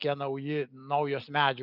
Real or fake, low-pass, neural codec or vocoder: real; 5.4 kHz; none